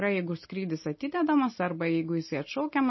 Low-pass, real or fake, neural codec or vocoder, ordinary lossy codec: 7.2 kHz; real; none; MP3, 24 kbps